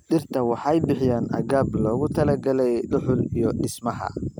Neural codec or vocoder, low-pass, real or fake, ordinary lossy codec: none; none; real; none